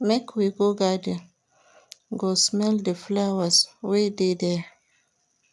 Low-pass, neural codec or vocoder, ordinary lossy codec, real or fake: none; none; none; real